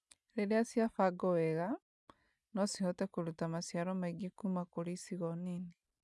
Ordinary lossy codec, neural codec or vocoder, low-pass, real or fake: none; none; none; real